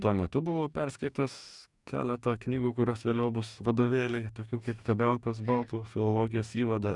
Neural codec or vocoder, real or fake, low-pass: codec, 44.1 kHz, 2.6 kbps, DAC; fake; 10.8 kHz